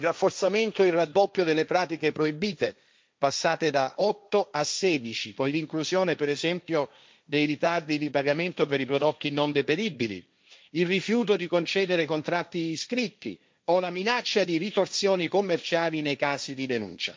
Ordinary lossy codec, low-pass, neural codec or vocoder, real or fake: none; none; codec, 16 kHz, 1.1 kbps, Voila-Tokenizer; fake